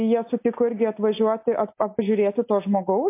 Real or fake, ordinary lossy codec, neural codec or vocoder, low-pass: real; MP3, 24 kbps; none; 3.6 kHz